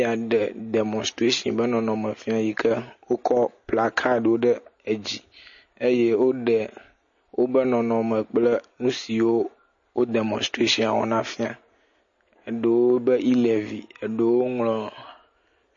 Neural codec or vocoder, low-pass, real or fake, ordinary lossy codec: none; 7.2 kHz; real; MP3, 32 kbps